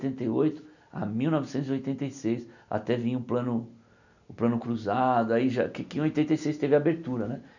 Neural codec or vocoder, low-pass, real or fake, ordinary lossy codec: none; 7.2 kHz; real; none